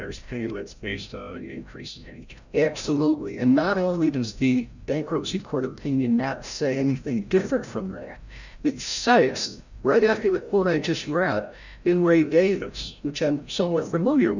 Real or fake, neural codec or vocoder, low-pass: fake; codec, 16 kHz, 0.5 kbps, FreqCodec, larger model; 7.2 kHz